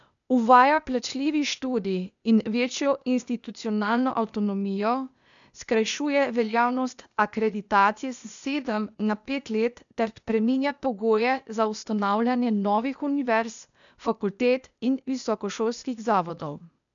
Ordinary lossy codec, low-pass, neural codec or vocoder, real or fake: none; 7.2 kHz; codec, 16 kHz, 0.8 kbps, ZipCodec; fake